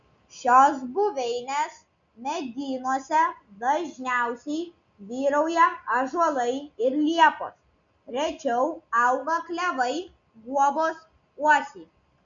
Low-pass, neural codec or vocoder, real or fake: 7.2 kHz; none; real